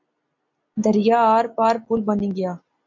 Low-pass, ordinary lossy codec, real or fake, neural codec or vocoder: 7.2 kHz; MP3, 64 kbps; real; none